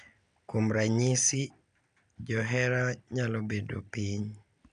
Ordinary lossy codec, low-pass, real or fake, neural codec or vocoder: none; 9.9 kHz; real; none